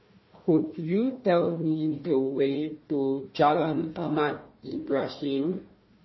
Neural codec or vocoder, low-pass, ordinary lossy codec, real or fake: codec, 16 kHz, 1 kbps, FunCodec, trained on Chinese and English, 50 frames a second; 7.2 kHz; MP3, 24 kbps; fake